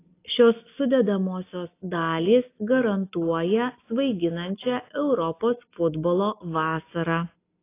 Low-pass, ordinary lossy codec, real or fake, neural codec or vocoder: 3.6 kHz; AAC, 24 kbps; real; none